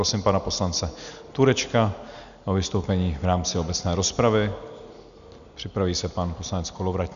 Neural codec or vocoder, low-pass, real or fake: none; 7.2 kHz; real